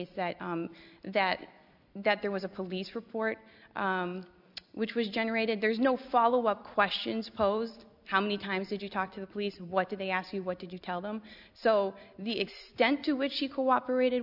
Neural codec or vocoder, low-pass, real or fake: none; 5.4 kHz; real